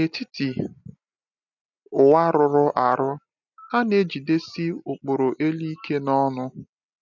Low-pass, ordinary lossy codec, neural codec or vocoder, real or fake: 7.2 kHz; none; none; real